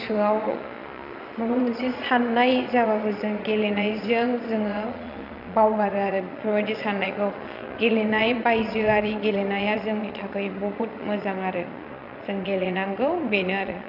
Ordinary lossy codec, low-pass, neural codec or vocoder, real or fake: none; 5.4 kHz; vocoder, 22.05 kHz, 80 mel bands, Vocos; fake